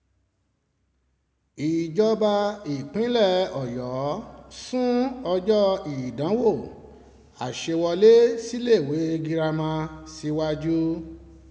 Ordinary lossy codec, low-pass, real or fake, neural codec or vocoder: none; none; real; none